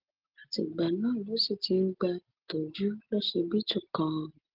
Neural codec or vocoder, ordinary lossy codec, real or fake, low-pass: none; Opus, 16 kbps; real; 5.4 kHz